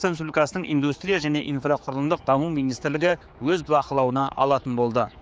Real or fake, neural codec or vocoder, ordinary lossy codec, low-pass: fake; codec, 16 kHz, 4 kbps, X-Codec, HuBERT features, trained on general audio; none; none